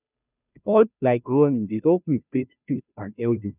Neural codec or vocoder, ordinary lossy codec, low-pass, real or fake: codec, 16 kHz, 0.5 kbps, FunCodec, trained on Chinese and English, 25 frames a second; none; 3.6 kHz; fake